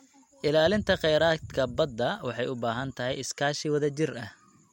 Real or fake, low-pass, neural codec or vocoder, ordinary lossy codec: real; 19.8 kHz; none; MP3, 64 kbps